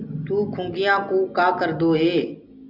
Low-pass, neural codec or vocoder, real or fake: 5.4 kHz; none; real